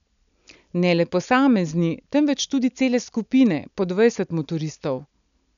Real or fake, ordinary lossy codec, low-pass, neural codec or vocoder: real; none; 7.2 kHz; none